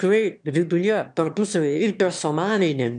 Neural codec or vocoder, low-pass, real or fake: autoencoder, 22.05 kHz, a latent of 192 numbers a frame, VITS, trained on one speaker; 9.9 kHz; fake